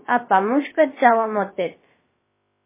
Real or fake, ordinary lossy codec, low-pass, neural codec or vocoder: fake; MP3, 16 kbps; 3.6 kHz; codec, 16 kHz, about 1 kbps, DyCAST, with the encoder's durations